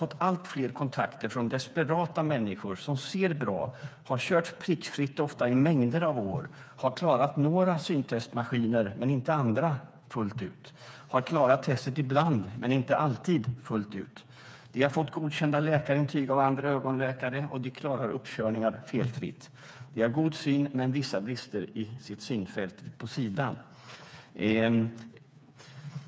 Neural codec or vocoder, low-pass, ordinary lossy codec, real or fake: codec, 16 kHz, 4 kbps, FreqCodec, smaller model; none; none; fake